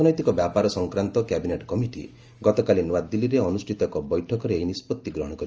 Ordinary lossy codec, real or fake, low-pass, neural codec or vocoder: Opus, 24 kbps; real; 7.2 kHz; none